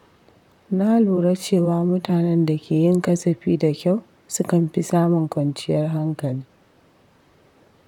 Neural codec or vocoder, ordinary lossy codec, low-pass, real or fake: vocoder, 44.1 kHz, 128 mel bands, Pupu-Vocoder; none; 19.8 kHz; fake